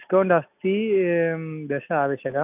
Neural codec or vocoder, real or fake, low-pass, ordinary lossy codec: none; real; 3.6 kHz; none